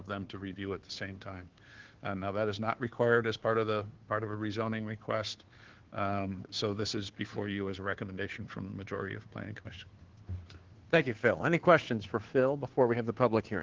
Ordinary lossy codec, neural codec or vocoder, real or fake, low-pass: Opus, 16 kbps; codec, 16 kHz, 2 kbps, FunCodec, trained on Chinese and English, 25 frames a second; fake; 7.2 kHz